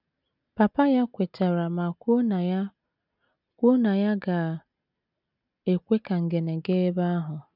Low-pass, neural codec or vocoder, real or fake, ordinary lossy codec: 5.4 kHz; none; real; none